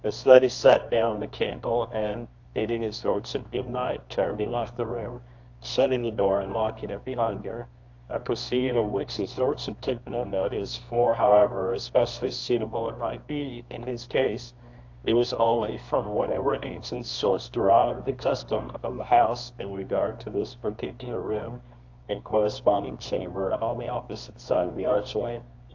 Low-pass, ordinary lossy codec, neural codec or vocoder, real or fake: 7.2 kHz; AAC, 48 kbps; codec, 24 kHz, 0.9 kbps, WavTokenizer, medium music audio release; fake